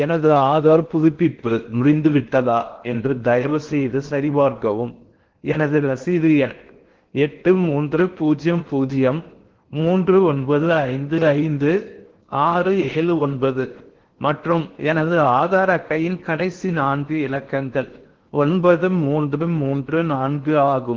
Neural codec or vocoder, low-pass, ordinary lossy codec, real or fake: codec, 16 kHz in and 24 kHz out, 0.6 kbps, FocalCodec, streaming, 4096 codes; 7.2 kHz; Opus, 16 kbps; fake